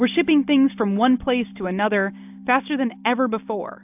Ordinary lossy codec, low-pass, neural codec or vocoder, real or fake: AAC, 32 kbps; 3.6 kHz; none; real